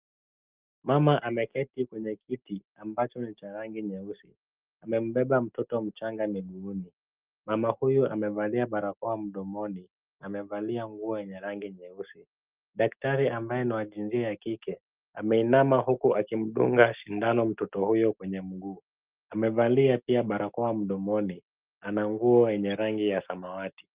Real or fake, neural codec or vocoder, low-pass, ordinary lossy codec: real; none; 3.6 kHz; Opus, 32 kbps